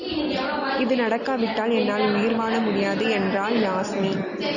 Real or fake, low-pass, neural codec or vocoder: real; 7.2 kHz; none